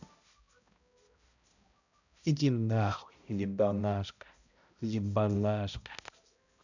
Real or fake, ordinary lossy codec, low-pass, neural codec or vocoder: fake; none; 7.2 kHz; codec, 16 kHz, 0.5 kbps, X-Codec, HuBERT features, trained on balanced general audio